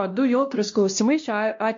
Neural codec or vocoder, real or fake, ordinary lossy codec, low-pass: codec, 16 kHz, 1 kbps, X-Codec, WavLM features, trained on Multilingual LibriSpeech; fake; MP3, 48 kbps; 7.2 kHz